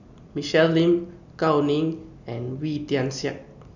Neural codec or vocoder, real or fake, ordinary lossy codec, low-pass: none; real; none; 7.2 kHz